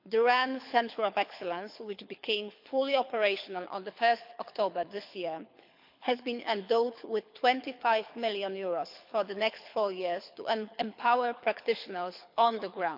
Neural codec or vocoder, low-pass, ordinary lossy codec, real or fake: codec, 24 kHz, 6 kbps, HILCodec; 5.4 kHz; MP3, 48 kbps; fake